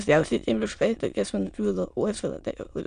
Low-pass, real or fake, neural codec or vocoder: 9.9 kHz; fake; autoencoder, 22.05 kHz, a latent of 192 numbers a frame, VITS, trained on many speakers